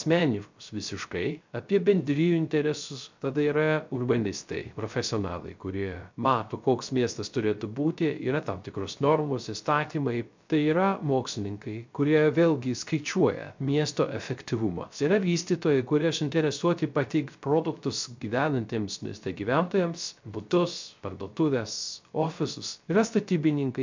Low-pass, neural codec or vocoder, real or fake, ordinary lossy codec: 7.2 kHz; codec, 16 kHz, 0.3 kbps, FocalCodec; fake; MP3, 64 kbps